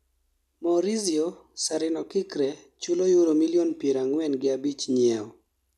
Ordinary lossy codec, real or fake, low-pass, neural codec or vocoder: none; real; 14.4 kHz; none